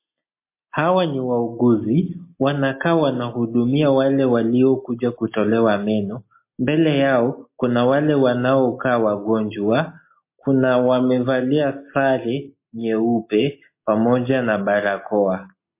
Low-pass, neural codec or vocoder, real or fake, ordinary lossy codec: 3.6 kHz; none; real; MP3, 24 kbps